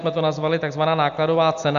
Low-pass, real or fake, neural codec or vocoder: 7.2 kHz; real; none